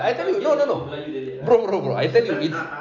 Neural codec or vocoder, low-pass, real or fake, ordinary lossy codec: none; 7.2 kHz; real; none